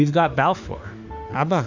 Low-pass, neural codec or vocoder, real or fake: 7.2 kHz; autoencoder, 48 kHz, 32 numbers a frame, DAC-VAE, trained on Japanese speech; fake